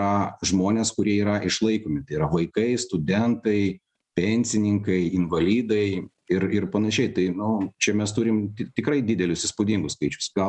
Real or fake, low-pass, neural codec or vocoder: real; 10.8 kHz; none